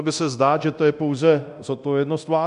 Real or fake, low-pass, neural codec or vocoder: fake; 10.8 kHz; codec, 24 kHz, 0.9 kbps, DualCodec